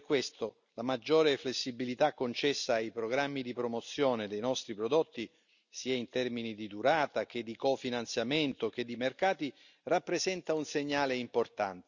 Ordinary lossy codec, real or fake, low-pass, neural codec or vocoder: none; real; 7.2 kHz; none